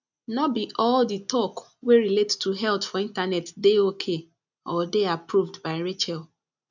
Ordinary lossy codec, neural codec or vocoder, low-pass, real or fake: none; none; 7.2 kHz; real